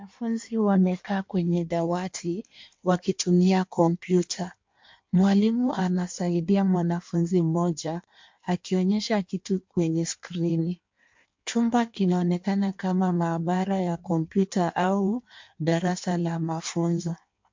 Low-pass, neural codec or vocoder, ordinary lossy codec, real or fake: 7.2 kHz; codec, 16 kHz in and 24 kHz out, 1.1 kbps, FireRedTTS-2 codec; MP3, 48 kbps; fake